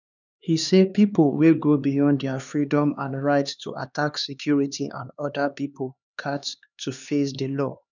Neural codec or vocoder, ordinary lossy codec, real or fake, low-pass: codec, 16 kHz, 2 kbps, X-Codec, HuBERT features, trained on LibriSpeech; none; fake; 7.2 kHz